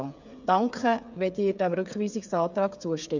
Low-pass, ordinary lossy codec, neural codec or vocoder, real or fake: 7.2 kHz; none; codec, 16 kHz, 8 kbps, FreqCodec, smaller model; fake